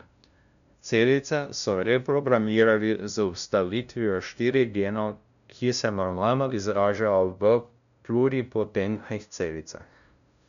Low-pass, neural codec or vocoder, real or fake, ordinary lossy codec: 7.2 kHz; codec, 16 kHz, 0.5 kbps, FunCodec, trained on LibriTTS, 25 frames a second; fake; none